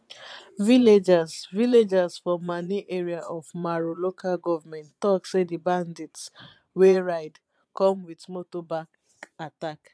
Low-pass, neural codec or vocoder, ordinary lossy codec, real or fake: none; vocoder, 22.05 kHz, 80 mel bands, Vocos; none; fake